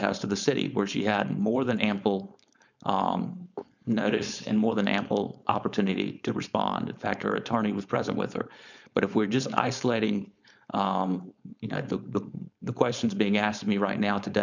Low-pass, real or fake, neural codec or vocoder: 7.2 kHz; fake; codec, 16 kHz, 4.8 kbps, FACodec